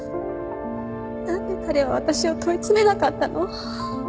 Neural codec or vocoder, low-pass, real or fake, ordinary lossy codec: none; none; real; none